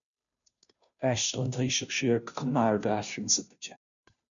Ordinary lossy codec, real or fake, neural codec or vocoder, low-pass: MP3, 96 kbps; fake; codec, 16 kHz, 0.5 kbps, FunCodec, trained on Chinese and English, 25 frames a second; 7.2 kHz